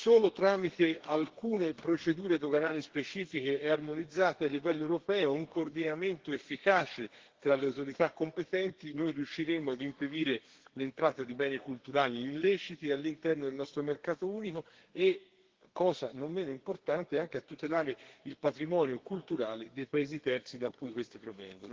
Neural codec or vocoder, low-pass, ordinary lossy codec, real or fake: codec, 32 kHz, 1.9 kbps, SNAC; 7.2 kHz; Opus, 16 kbps; fake